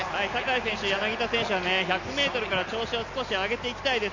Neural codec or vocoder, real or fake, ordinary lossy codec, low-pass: none; real; none; 7.2 kHz